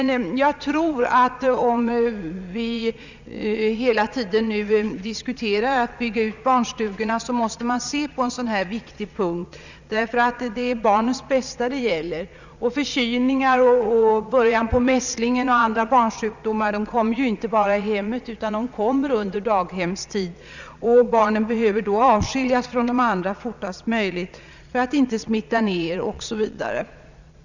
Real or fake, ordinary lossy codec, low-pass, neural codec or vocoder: fake; none; 7.2 kHz; vocoder, 22.05 kHz, 80 mel bands, Vocos